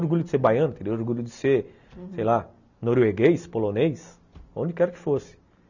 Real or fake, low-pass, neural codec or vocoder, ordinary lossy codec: real; 7.2 kHz; none; none